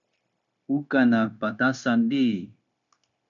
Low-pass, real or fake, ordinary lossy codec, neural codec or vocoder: 7.2 kHz; fake; MP3, 64 kbps; codec, 16 kHz, 0.9 kbps, LongCat-Audio-Codec